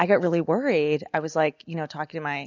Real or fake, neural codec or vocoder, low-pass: real; none; 7.2 kHz